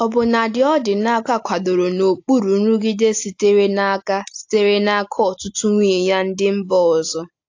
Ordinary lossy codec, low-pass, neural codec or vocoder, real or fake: AAC, 48 kbps; 7.2 kHz; none; real